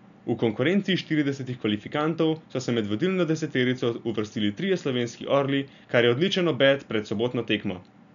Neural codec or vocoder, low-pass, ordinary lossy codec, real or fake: none; 7.2 kHz; none; real